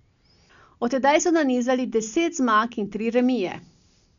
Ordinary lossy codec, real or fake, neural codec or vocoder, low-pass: none; real; none; 7.2 kHz